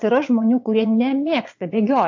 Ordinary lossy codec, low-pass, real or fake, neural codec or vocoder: AAC, 48 kbps; 7.2 kHz; fake; vocoder, 22.05 kHz, 80 mel bands, Vocos